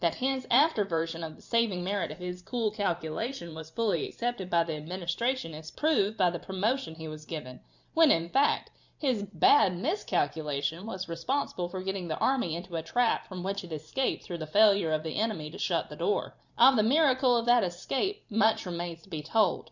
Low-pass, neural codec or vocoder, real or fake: 7.2 kHz; vocoder, 44.1 kHz, 128 mel bands every 512 samples, BigVGAN v2; fake